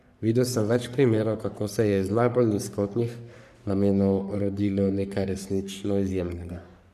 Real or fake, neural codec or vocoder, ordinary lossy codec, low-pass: fake; codec, 44.1 kHz, 3.4 kbps, Pupu-Codec; none; 14.4 kHz